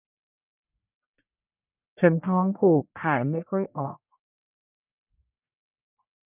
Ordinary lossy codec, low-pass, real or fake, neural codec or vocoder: none; 3.6 kHz; fake; codec, 44.1 kHz, 1.7 kbps, Pupu-Codec